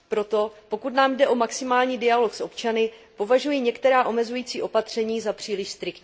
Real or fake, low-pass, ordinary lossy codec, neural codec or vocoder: real; none; none; none